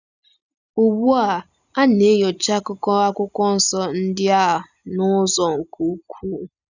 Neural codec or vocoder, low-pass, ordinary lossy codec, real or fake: none; 7.2 kHz; none; real